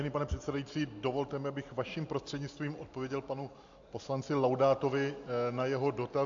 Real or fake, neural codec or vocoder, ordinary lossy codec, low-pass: real; none; AAC, 64 kbps; 7.2 kHz